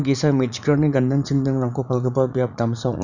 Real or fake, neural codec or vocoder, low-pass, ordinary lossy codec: fake; vocoder, 44.1 kHz, 80 mel bands, Vocos; 7.2 kHz; none